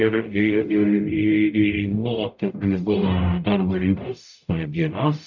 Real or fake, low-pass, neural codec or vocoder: fake; 7.2 kHz; codec, 44.1 kHz, 0.9 kbps, DAC